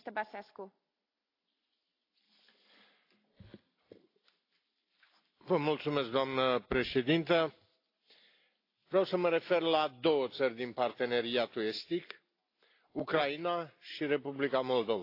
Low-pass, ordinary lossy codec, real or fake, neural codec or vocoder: 5.4 kHz; AAC, 32 kbps; real; none